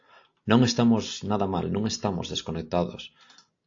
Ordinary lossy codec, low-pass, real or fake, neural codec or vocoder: AAC, 48 kbps; 7.2 kHz; real; none